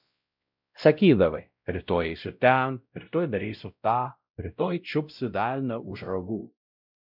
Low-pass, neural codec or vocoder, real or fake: 5.4 kHz; codec, 16 kHz, 0.5 kbps, X-Codec, WavLM features, trained on Multilingual LibriSpeech; fake